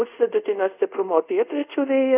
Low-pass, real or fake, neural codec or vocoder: 3.6 kHz; fake; codec, 24 kHz, 0.5 kbps, DualCodec